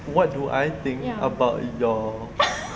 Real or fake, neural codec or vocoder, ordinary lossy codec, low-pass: real; none; none; none